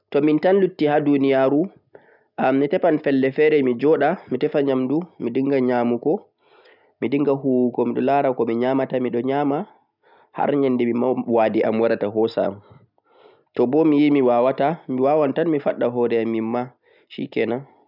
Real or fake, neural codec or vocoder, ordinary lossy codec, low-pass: real; none; none; 5.4 kHz